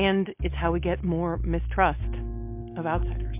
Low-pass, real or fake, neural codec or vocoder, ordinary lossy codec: 3.6 kHz; real; none; MP3, 32 kbps